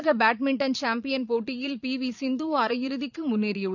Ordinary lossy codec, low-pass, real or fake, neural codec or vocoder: none; 7.2 kHz; fake; vocoder, 22.05 kHz, 80 mel bands, Vocos